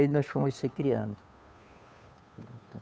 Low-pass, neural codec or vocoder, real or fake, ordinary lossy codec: none; codec, 16 kHz, 2 kbps, FunCodec, trained on Chinese and English, 25 frames a second; fake; none